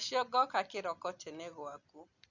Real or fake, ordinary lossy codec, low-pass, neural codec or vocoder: real; none; 7.2 kHz; none